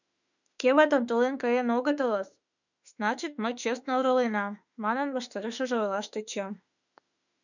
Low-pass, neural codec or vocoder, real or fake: 7.2 kHz; autoencoder, 48 kHz, 32 numbers a frame, DAC-VAE, trained on Japanese speech; fake